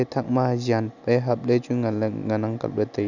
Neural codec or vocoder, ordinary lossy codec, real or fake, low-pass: none; none; real; 7.2 kHz